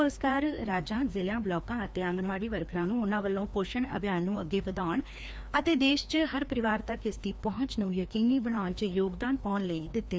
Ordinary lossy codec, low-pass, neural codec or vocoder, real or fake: none; none; codec, 16 kHz, 2 kbps, FreqCodec, larger model; fake